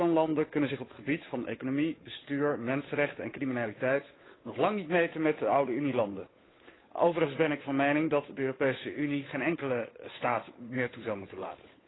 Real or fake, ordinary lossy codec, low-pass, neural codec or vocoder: fake; AAC, 16 kbps; 7.2 kHz; codec, 16 kHz, 8 kbps, FunCodec, trained on Chinese and English, 25 frames a second